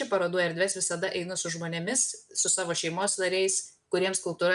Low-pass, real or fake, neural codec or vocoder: 10.8 kHz; real; none